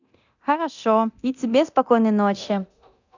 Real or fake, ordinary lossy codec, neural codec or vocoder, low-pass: fake; none; codec, 24 kHz, 0.9 kbps, DualCodec; 7.2 kHz